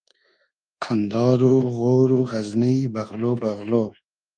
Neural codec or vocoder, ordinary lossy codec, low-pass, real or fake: codec, 24 kHz, 1.2 kbps, DualCodec; Opus, 32 kbps; 9.9 kHz; fake